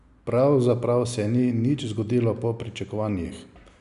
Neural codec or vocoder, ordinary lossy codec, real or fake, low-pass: none; none; real; 10.8 kHz